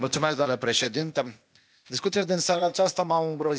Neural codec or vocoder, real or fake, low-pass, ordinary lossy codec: codec, 16 kHz, 0.8 kbps, ZipCodec; fake; none; none